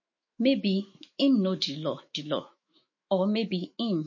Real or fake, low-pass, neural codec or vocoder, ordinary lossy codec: fake; 7.2 kHz; autoencoder, 48 kHz, 128 numbers a frame, DAC-VAE, trained on Japanese speech; MP3, 32 kbps